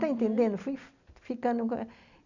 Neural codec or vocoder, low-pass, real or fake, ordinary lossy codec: none; 7.2 kHz; real; none